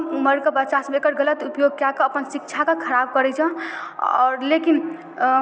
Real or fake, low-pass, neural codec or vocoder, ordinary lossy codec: real; none; none; none